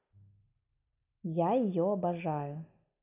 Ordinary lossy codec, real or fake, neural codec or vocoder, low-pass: none; real; none; 3.6 kHz